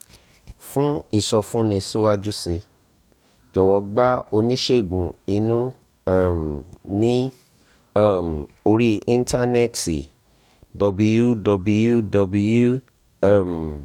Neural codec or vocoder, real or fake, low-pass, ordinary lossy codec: codec, 44.1 kHz, 2.6 kbps, DAC; fake; 19.8 kHz; none